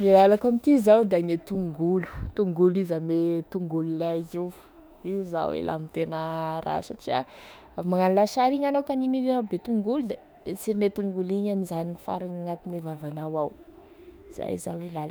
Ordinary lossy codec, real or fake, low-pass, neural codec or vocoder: none; fake; none; autoencoder, 48 kHz, 32 numbers a frame, DAC-VAE, trained on Japanese speech